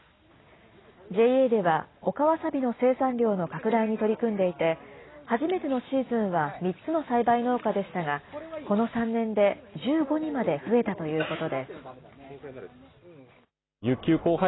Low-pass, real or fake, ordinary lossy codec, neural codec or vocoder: 7.2 kHz; real; AAC, 16 kbps; none